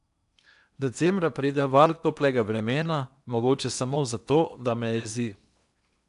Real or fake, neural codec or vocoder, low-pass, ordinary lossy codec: fake; codec, 16 kHz in and 24 kHz out, 0.8 kbps, FocalCodec, streaming, 65536 codes; 10.8 kHz; none